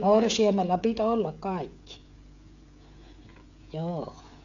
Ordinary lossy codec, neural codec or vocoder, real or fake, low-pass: none; codec, 16 kHz, 16 kbps, FreqCodec, smaller model; fake; 7.2 kHz